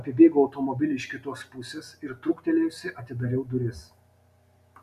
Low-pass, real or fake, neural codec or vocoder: 14.4 kHz; real; none